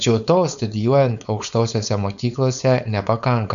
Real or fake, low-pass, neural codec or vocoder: fake; 7.2 kHz; codec, 16 kHz, 4.8 kbps, FACodec